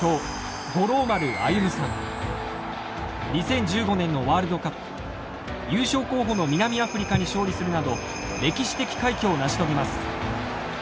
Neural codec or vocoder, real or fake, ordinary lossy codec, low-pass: none; real; none; none